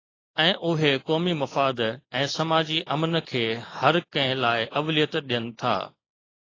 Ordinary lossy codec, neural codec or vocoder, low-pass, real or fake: AAC, 32 kbps; none; 7.2 kHz; real